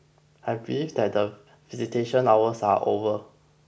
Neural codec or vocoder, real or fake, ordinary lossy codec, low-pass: none; real; none; none